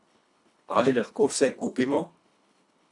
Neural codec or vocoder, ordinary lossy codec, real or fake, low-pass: codec, 24 kHz, 1.5 kbps, HILCodec; none; fake; 10.8 kHz